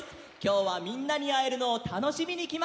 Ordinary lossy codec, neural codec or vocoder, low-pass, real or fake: none; none; none; real